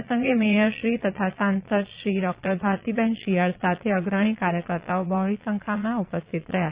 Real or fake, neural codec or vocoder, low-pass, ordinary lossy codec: fake; vocoder, 22.05 kHz, 80 mel bands, Vocos; 3.6 kHz; Opus, 64 kbps